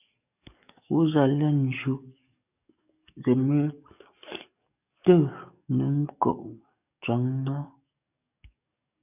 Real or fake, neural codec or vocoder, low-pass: fake; codec, 44.1 kHz, 7.8 kbps, DAC; 3.6 kHz